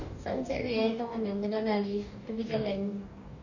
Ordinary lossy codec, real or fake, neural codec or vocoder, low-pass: none; fake; codec, 44.1 kHz, 2.6 kbps, DAC; 7.2 kHz